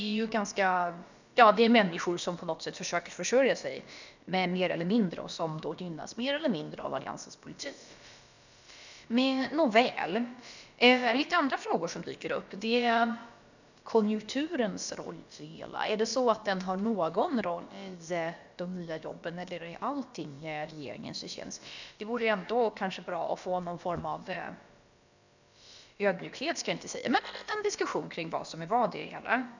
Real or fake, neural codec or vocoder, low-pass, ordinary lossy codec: fake; codec, 16 kHz, about 1 kbps, DyCAST, with the encoder's durations; 7.2 kHz; none